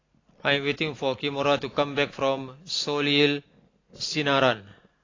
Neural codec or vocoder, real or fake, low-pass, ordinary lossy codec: none; real; 7.2 kHz; AAC, 32 kbps